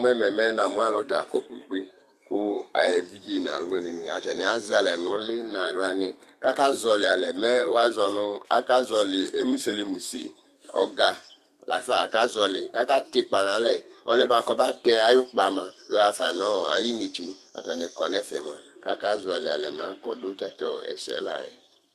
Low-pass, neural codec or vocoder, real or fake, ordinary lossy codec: 14.4 kHz; codec, 32 kHz, 1.9 kbps, SNAC; fake; Opus, 64 kbps